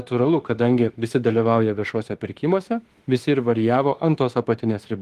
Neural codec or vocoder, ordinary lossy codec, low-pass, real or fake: autoencoder, 48 kHz, 32 numbers a frame, DAC-VAE, trained on Japanese speech; Opus, 24 kbps; 14.4 kHz; fake